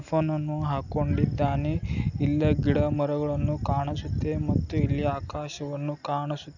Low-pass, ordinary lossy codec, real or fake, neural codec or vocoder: 7.2 kHz; none; real; none